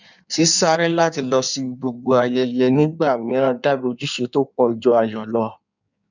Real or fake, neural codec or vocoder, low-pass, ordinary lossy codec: fake; codec, 16 kHz in and 24 kHz out, 1.1 kbps, FireRedTTS-2 codec; 7.2 kHz; none